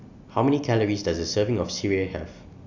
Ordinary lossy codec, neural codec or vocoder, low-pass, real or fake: none; none; 7.2 kHz; real